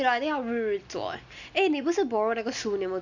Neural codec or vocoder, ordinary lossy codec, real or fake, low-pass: none; none; real; 7.2 kHz